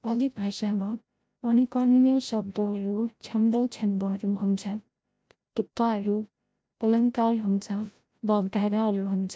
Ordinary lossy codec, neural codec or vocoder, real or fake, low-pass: none; codec, 16 kHz, 0.5 kbps, FreqCodec, larger model; fake; none